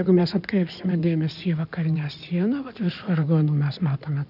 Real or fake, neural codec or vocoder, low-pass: fake; codec, 16 kHz in and 24 kHz out, 2.2 kbps, FireRedTTS-2 codec; 5.4 kHz